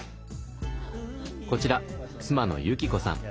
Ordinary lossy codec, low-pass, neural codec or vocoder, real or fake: none; none; none; real